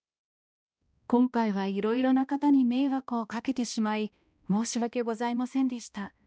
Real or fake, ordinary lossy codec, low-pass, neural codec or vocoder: fake; none; none; codec, 16 kHz, 1 kbps, X-Codec, HuBERT features, trained on balanced general audio